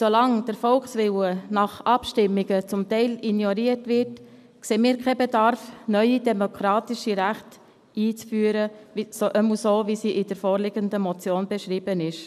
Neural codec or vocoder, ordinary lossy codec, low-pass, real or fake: none; none; 14.4 kHz; real